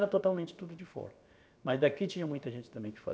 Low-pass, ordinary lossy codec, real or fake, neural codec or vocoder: none; none; fake; codec, 16 kHz, about 1 kbps, DyCAST, with the encoder's durations